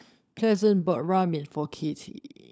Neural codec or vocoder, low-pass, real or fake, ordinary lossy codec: codec, 16 kHz, 16 kbps, FunCodec, trained on LibriTTS, 50 frames a second; none; fake; none